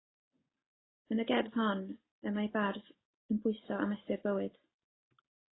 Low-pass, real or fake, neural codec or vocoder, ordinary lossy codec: 7.2 kHz; real; none; AAC, 16 kbps